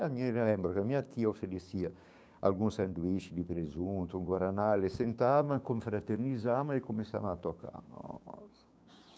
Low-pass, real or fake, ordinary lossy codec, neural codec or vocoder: none; fake; none; codec, 16 kHz, 6 kbps, DAC